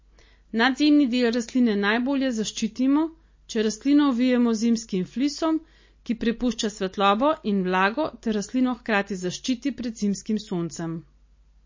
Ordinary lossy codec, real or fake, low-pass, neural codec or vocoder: MP3, 32 kbps; real; 7.2 kHz; none